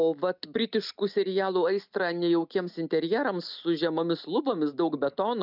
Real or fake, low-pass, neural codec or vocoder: real; 5.4 kHz; none